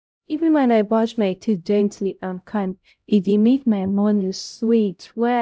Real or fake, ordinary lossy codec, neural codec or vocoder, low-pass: fake; none; codec, 16 kHz, 0.5 kbps, X-Codec, HuBERT features, trained on LibriSpeech; none